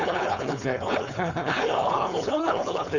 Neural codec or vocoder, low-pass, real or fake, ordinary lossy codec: codec, 16 kHz, 4.8 kbps, FACodec; 7.2 kHz; fake; Opus, 64 kbps